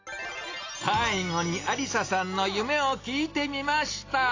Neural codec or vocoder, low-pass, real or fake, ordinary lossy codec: none; 7.2 kHz; real; AAC, 32 kbps